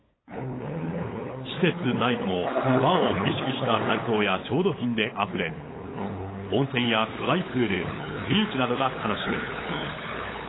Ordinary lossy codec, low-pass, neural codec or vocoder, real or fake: AAC, 16 kbps; 7.2 kHz; codec, 16 kHz, 8 kbps, FunCodec, trained on LibriTTS, 25 frames a second; fake